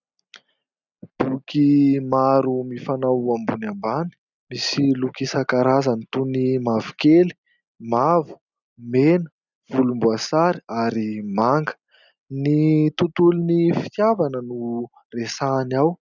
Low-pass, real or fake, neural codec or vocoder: 7.2 kHz; real; none